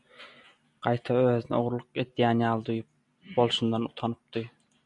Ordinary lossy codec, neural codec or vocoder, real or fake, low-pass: MP3, 96 kbps; none; real; 10.8 kHz